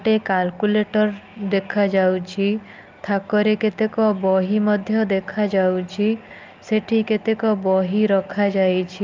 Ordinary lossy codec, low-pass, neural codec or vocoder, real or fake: Opus, 24 kbps; 7.2 kHz; none; real